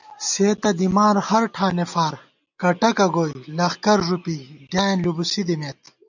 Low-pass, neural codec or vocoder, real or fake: 7.2 kHz; none; real